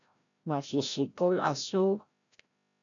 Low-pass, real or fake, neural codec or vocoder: 7.2 kHz; fake; codec, 16 kHz, 0.5 kbps, FreqCodec, larger model